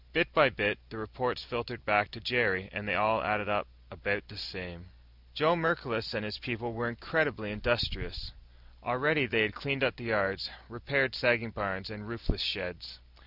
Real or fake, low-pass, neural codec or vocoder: real; 5.4 kHz; none